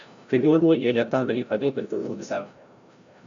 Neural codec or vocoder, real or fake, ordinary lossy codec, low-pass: codec, 16 kHz, 0.5 kbps, FreqCodec, larger model; fake; MP3, 64 kbps; 7.2 kHz